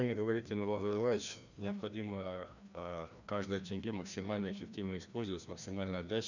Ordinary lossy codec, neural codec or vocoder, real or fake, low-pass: none; codec, 16 kHz, 1 kbps, FreqCodec, larger model; fake; 7.2 kHz